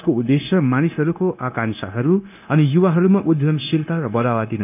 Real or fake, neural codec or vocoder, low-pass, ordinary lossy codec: fake; codec, 16 kHz, 0.9 kbps, LongCat-Audio-Codec; 3.6 kHz; none